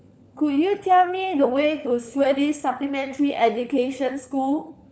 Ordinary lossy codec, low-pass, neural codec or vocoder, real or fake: none; none; codec, 16 kHz, 4 kbps, FunCodec, trained on LibriTTS, 50 frames a second; fake